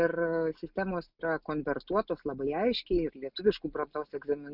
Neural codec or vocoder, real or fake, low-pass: none; real; 5.4 kHz